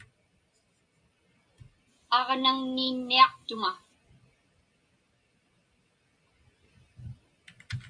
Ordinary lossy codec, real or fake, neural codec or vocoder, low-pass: MP3, 48 kbps; real; none; 9.9 kHz